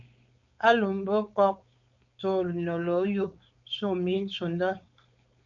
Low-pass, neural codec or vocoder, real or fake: 7.2 kHz; codec, 16 kHz, 4.8 kbps, FACodec; fake